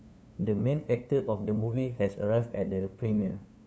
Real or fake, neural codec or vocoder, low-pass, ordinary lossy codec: fake; codec, 16 kHz, 2 kbps, FunCodec, trained on LibriTTS, 25 frames a second; none; none